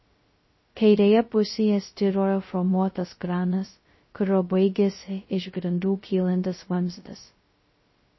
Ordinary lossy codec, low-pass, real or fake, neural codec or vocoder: MP3, 24 kbps; 7.2 kHz; fake; codec, 16 kHz, 0.2 kbps, FocalCodec